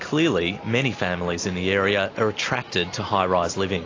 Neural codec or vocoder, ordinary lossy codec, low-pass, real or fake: none; AAC, 32 kbps; 7.2 kHz; real